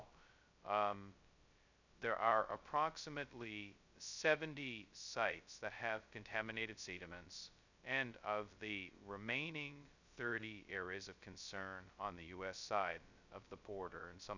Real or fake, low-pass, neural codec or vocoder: fake; 7.2 kHz; codec, 16 kHz, 0.2 kbps, FocalCodec